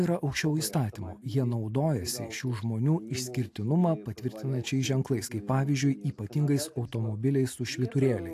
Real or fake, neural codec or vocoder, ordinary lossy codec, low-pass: real; none; AAC, 64 kbps; 14.4 kHz